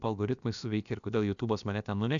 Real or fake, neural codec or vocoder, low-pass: fake; codec, 16 kHz, about 1 kbps, DyCAST, with the encoder's durations; 7.2 kHz